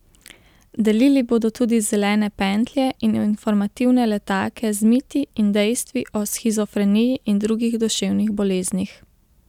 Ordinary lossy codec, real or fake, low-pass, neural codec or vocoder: none; real; 19.8 kHz; none